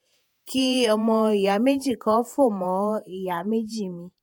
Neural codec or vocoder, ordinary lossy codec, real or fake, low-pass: vocoder, 48 kHz, 128 mel bands, Vocos; none; fake; 19.8 kHz